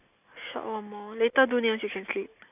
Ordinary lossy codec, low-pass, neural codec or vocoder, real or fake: none; 3.6 kHz; none; real